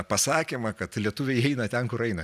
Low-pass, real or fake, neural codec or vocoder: 14.4 kHz; real; none